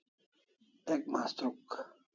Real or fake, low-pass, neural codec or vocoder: real; 7.2 kHz; none